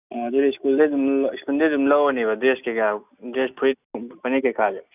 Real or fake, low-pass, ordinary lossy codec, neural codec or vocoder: real; 3.6 kHz; none; none